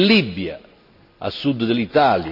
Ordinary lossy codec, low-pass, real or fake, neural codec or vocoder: none; 5.4 kHz; real; none